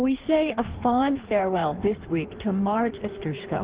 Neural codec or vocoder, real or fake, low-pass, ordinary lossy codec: codec, 16 kHz in and 24 kHz out, 1.1 kbps, FireRedTTS-2 codec; fake; 3.6 kHz; Opus, 16 kbps